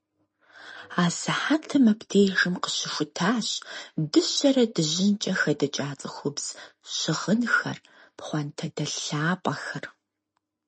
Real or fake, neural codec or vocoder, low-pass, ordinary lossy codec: real; none; 10.8 kHz; MP3, 32 kbps